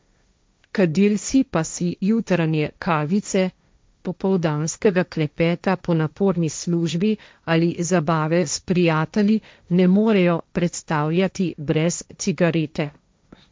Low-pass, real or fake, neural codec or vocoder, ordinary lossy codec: none; fake; codec, 16 kHz, 1.1 kbps, Voila-Tokenizer; none